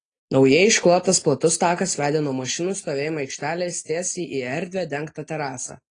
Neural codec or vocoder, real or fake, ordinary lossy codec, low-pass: none; real; AAC, 32 kbps; 9.9 kHz